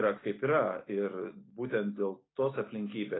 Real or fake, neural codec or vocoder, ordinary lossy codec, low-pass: real; none; AAC, 16 kbps; 7.2 kHz